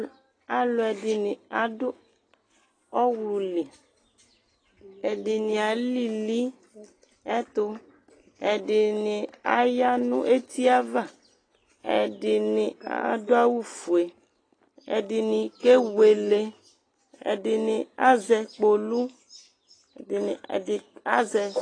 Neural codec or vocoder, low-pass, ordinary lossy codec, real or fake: none; 9.9 kHz; AAC, 32 kbps; real